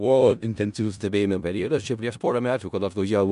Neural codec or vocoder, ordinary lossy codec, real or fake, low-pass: codec, 16 kHz in and 24 kHz out, 0.4 kbps, LongCat-Audio-Codec, four codebook decoder; MP3, 96 kbps; fake; 10.8 kHz